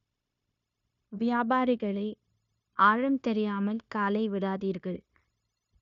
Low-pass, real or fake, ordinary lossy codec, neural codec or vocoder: 7.2 kHz; fake; none; codec, 16 kHz, 0.9 kbps, LongCat-Audio-Codec